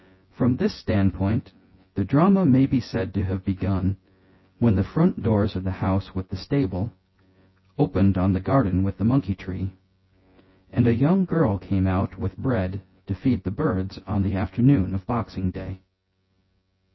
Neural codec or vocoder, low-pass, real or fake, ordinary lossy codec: vocoder, 24 kHz, 100 mel bands, Vocos; 7.2 kHz; fake; MP3, 24 kbps